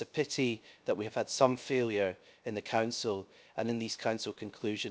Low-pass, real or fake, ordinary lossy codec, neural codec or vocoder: none; fake; none; codec, 16 kHz, 0.7 kbps, FocalCodec